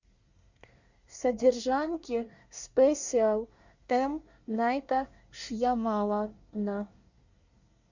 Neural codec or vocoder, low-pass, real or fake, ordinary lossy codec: codec, 24 kHz, 1 kbps, SNAC; 7.2 kHz; fake; Opus, 64 kbps